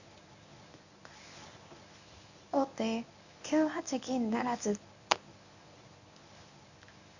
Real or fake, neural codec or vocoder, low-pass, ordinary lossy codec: fake; codec, 24 kHz, 0.9 kbps, WavTokenizer, medium speech release version 1; 7.2 kHz; none